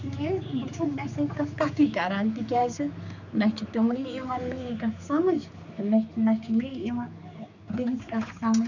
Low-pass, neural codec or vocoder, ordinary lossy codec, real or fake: 7.2 kHz; codec, 16 kHz, 4 kbps, X-Codec, HuBERT features, trained on general audio; none; fake